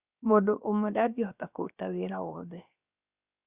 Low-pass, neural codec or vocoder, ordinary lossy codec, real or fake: 3.6 kHz; codec, 16 kHz, 0.7 kbps, FocalCodec; Opus, 64 kbps; fake